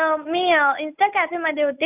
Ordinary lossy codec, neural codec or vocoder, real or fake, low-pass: none; none; real; 3.6 kHz